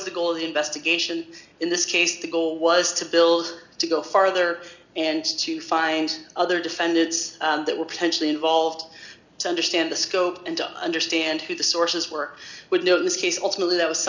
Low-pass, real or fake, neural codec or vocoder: 7.2 kHz; real; none